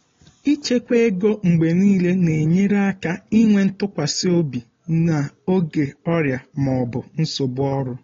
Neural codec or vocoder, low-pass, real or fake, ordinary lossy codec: none; 7.2 kHz; real; AAC, 32 kbps